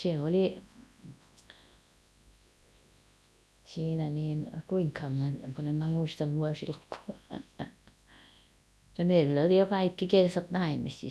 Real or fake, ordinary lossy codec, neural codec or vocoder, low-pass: fake; none; codec, 24 kHz, 0.9 kbps, WavTokenizer, large speech release; none